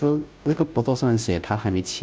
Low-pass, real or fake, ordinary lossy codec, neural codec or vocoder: none; fake; none; codec, 16 kHz, 0.5 kbps, FunCodec, trained on Chinese and English, 25 frames a second